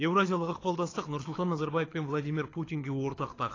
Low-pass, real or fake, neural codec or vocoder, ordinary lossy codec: 7.2 kHz; fake; codec, 24 kHz, 6 kbps, HILCodec; AAC, 32 kbps